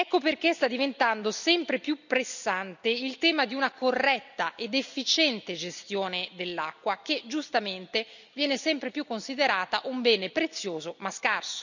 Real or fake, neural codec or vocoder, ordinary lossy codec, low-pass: real; none; none; 7.2 kHz